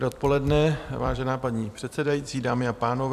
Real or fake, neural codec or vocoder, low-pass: fake; vocoder, 44.1 kHz, 128 mel bands every 256 samples, BigVGAN v2; 14.4 kHz